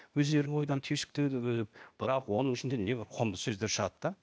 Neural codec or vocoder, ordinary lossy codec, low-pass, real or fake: codec, 16 kHz, 0.8 kbps, ZipCodec; none; none; fake